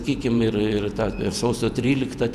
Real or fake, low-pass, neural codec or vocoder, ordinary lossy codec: fake; 14.4 kHz; vocoder, 48 kHz, 128 mel bands, Vocos; MP3, 96 kbps